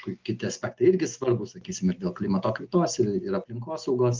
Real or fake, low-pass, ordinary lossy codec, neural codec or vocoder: real; 7.2 kHz; Opus, 24 kbps; none